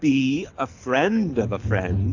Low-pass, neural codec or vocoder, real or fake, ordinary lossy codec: 7.2 kHz; codec, 24 kHz, 6 kbps, HILCodec; fake; AAC, 48 kbps